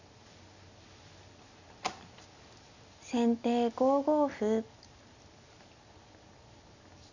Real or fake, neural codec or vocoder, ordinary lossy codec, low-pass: real; none; none; 7.2 kHz